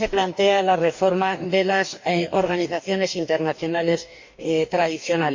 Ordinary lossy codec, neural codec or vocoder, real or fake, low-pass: MP3, 48 kbps; codec, 44.1 kHz, 2.6 kbps, DAC; fake; 7.2 kHz